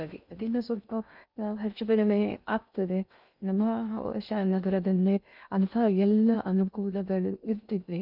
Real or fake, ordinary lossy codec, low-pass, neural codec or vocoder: fake; none; 5.4 kHz; codec, 16 kHz in and 24 kHz out, 0.6 kbps, FocalCodec, streaming, 2048 codes